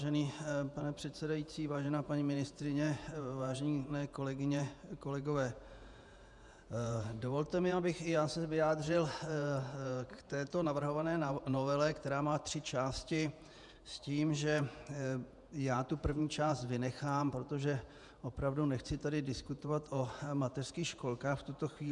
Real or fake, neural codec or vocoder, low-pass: fake; vocoder, 48 kHz, 128 mel bands, Vocos; 10.8 kHz